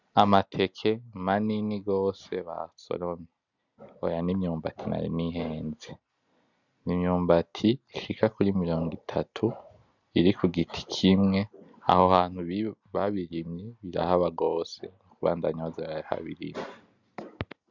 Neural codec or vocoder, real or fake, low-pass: none; real; 7.2 kHz